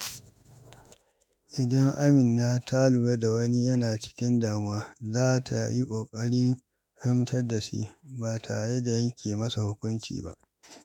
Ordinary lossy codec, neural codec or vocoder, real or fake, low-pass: none; autoencoder, 48 kHz, 32 numbers a frame, DAC-VAE, trained on Japanese speech; fake; 19.8 kHz